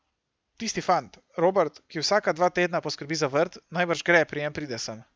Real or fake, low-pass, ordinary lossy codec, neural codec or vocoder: real; none; none; none